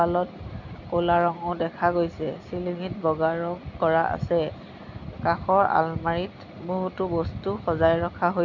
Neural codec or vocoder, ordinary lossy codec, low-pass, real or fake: none; none; 7.2 kHz; real